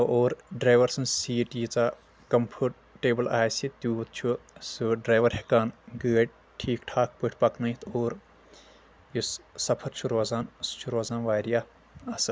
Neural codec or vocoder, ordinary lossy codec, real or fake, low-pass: none; none; real; none